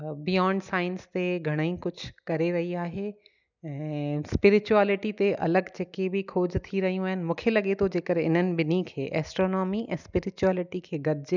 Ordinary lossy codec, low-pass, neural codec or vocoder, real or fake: none; 7.2 kHz; none; real